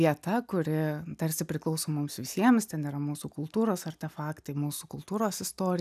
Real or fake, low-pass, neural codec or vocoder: real; 14.4 kHz; none